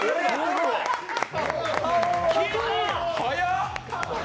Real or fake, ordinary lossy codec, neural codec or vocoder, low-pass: real; none; none; none